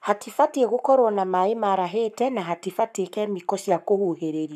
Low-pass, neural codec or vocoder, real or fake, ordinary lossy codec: 14.4 kHz; codec, 44.1 kHz, 7.8 kbps, Pupu-Codec; fake; none